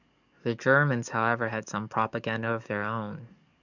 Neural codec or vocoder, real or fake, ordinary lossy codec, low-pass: codec, 44.1 kHz, 7.8 kbps, DAC; fake; none; 7.2 kHz